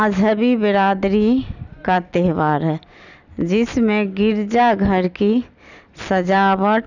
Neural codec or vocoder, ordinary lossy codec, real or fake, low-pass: none; none; real; 7.2 kHz